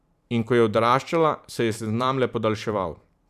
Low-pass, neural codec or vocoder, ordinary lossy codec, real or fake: 14.4 kHz; vocoder, 44.1 kHz, 128 mel bands every 512 samples, BigVGAN v2; none; fake